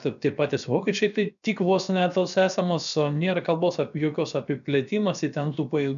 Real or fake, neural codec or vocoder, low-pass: fake; codec, 16 kHz, about 1 kbps, DyCAST, with the encoder's durations; 7.2 kHz